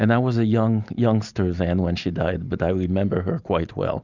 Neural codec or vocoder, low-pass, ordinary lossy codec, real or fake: none; 7.2 kHz; Opus, 64 kbps; real